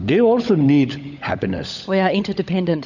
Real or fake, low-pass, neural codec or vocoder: fake; 7.2 kHz; codec, 16 kHz, 16 kbps, FunCodec, trained on LibriTTS, 50 frames a second